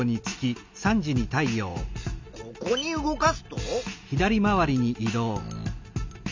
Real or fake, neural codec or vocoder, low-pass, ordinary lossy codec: real; none; 7.2 kHz; MP3, 64 kbps